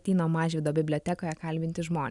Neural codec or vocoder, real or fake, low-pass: none; real; 10.8 kHz